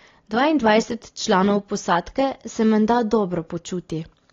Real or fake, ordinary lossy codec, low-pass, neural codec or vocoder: real; AAC, 32 kbps; 7.2 kHz; none